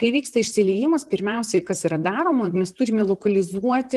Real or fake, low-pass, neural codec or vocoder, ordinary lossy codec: fake; 14.4 kHz; vocoder, 44.1 kHz, 128 mel bands, Pupu-Vocoder; Opus, 24 kbps